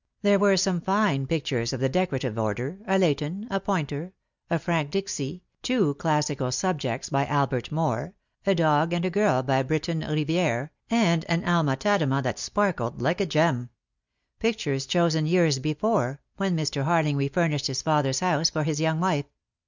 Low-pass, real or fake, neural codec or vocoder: 7.2 kHz; real; none